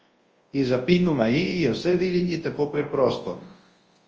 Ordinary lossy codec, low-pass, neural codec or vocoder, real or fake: Opus, 24 kbps; 7.2 kHz; codec, 24 kHz, 0.9 kbps, WavTokenizer, large speech release; fake